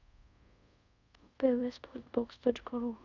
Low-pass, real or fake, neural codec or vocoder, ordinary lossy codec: 7.2 kHz; fake; codec, 24 kHz, 0.5 kbps, DualCodec; none